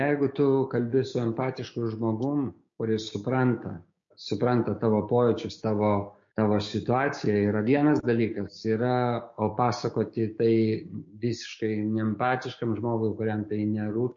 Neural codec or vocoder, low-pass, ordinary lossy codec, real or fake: codec, 16 kHz, 6 kbps, DAC; 7.2 kHz; MP3, 48 kbps; fake